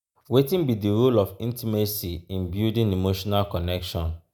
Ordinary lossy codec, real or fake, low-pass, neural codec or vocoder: none; real; none; none